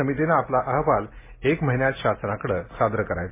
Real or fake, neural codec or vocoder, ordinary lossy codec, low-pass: real; none; MP3, 16 kbps; 3.6 kHz